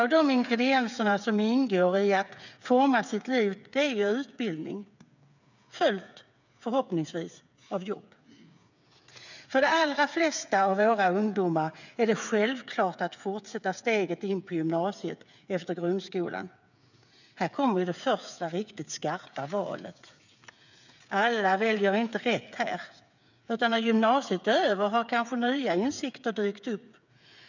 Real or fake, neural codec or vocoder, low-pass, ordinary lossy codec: fake; codec, 16 kHz, 8 kbps, FreqCodec, smaller model; 7.2 kHz; none